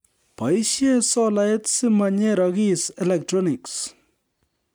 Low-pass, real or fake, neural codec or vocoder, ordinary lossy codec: none; real; none; none